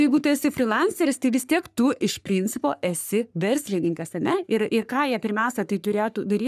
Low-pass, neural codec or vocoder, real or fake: 14.4 kHz; codec, 44.1 kHz, 3.4 kbps, Pupu-Codec; fake